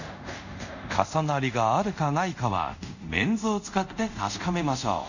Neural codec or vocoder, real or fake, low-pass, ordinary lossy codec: codec, 24 kHz, 0.5 kbps, DualCodec; fake; 7.2 kHz; none